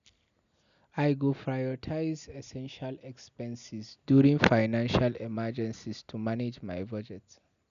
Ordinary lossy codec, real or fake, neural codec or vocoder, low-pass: none; real; none; 7.2 kHz